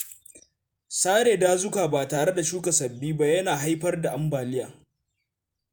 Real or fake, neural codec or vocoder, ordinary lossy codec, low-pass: fake; vocoder, 48 kHz, 128 mel bands, Vocos; none; none